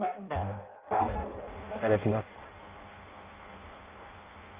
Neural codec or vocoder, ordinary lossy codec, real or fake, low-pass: codec, 16 kHz in and 24 kHz out, 0.6 kbps, FireRedTTS-2 codec; Opus, 24 kbps; fake; 3.6 kHz